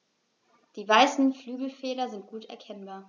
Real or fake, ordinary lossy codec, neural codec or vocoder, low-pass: real; none; none; 7.2 kHz